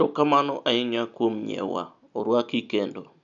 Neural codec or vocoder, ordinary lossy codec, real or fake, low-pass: none; none; real; 7.2 kHz